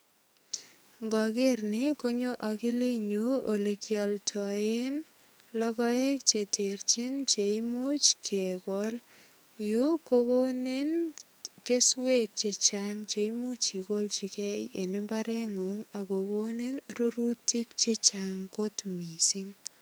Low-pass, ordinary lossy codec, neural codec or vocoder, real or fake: none; none; codec, 44.1 kHz, 2.6 kbps, SNAC; fake